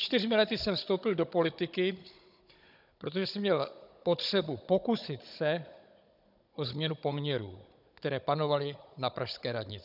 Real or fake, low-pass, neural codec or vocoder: fake; 5.4 kHz; codec, 16 kHz, 16 kbps, FunCodec, trained on Chinese and English, 50 frames a second